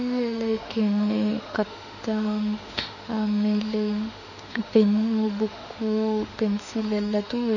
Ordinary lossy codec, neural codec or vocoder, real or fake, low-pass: none; autoencoder, 48 kHz, 32 numbers a frame, DAC-VAE, trained on Japanese speech; fake; 7.2 kHz